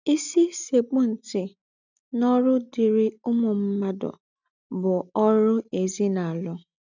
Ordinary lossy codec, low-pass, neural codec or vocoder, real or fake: none; 7.2 kHz; none; real